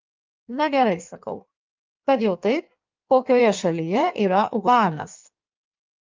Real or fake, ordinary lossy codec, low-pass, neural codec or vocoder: fake; Opus, 24 kbps; 7.2 kHz; codec, 16 kHz in and 24 kHz out, 1.1 kbps, FireRedTTS-2 codec